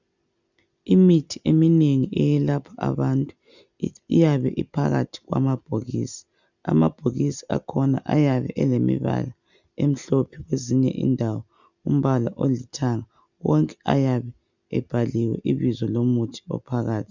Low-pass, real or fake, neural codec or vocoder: 7.2 kHz; real; none